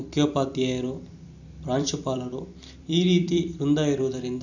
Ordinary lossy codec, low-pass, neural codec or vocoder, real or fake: none; 7.2 kHz; vocoder, 44.1 kHz, 128 mel bands every 256 samples, BigVGAN v2; fake